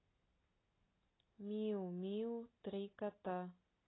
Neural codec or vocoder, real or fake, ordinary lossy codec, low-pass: none; real; AAC, 16 kbps; 7.2 kHz